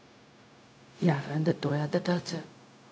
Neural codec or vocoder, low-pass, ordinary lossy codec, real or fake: codec, 16 kHz, 0.4 kbps, LongCat-Audio-Codec; none; none; fake